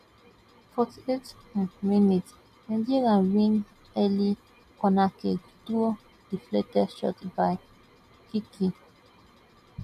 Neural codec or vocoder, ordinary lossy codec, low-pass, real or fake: none; none; 14.4 kHz; real